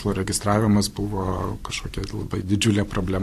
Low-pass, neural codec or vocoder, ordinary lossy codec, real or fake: 14.4 kHz; vocoder, 44.1 kHz, 128 mel bands every 512 samples, BigVGAN v2; AAC, 64 kbps; fake